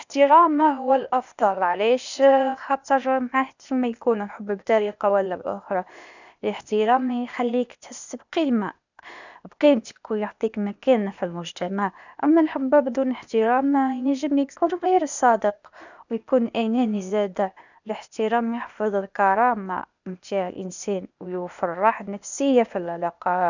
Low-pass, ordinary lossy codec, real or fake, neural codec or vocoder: 7.2 kHz; none; fake; codec, 16 kHz, 0.8 kbps, ZipCodec